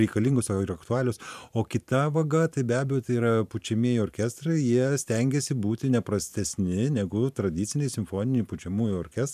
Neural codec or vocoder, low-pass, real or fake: vocoder, 44.1 kHz, 128 mel bands every 512 samples, BigVGAN v2; 14.4 kHz; fake